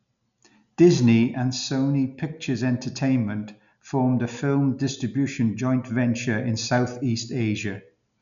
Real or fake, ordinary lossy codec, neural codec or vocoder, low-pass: real; none; none; 7.2 kHz